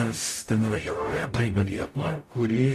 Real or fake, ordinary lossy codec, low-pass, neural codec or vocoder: fake; AAC, 48 kbps; 14.4 kHz; codec, 44.1 kHz, 0.9 kbps, DAC